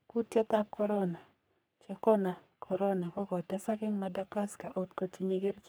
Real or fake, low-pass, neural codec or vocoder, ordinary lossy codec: fake; none; codec, 44.1 kHz, 3.4 kbps, Pupu-Codec; none